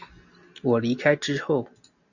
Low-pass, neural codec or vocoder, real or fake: 7.2 kHz; none; real